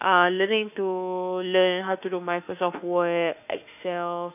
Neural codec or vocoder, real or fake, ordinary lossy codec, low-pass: autoencoder, 48 kHz, 32 numbers a frame, DAC-VAE, trained on Japanese speech; fake; none; 3.6 kHz